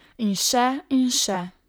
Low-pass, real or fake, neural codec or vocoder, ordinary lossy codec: none; fake; vocoder, 44.1 kHz, 128 mel bands, Pupu-Vocoder; none